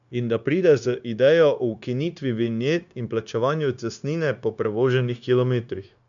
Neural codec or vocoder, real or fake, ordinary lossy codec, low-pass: codec, 16 kHz, 0.9 kbps, LongCat-Audio-Codec; fake; none; 7.2 kHz